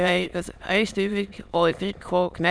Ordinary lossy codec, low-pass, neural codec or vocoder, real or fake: none; none; autoencoder, 22.05 kHz, a latent of 192 numbers a frame, VITS, trained on many speakers; fake